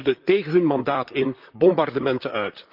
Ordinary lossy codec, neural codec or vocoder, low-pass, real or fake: Opus, 24 kbps; vocoder, 44.1 kHz, 128 mel bands, Pupu-Vocoder; 5.4 kHz; fake